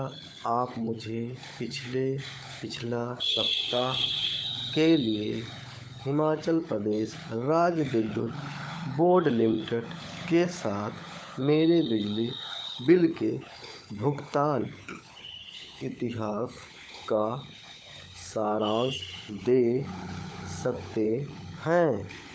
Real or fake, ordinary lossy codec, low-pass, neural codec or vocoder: fake; none; none; codec, 16 kHz, 16 kbps, FunCodec, trained on LibriTTS, 50 frames a second